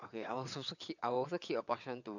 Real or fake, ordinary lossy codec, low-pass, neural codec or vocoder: fake; none; 7.2 kHz; vocoder, 22.05 kHz, 80 mel bands, WaveNeXt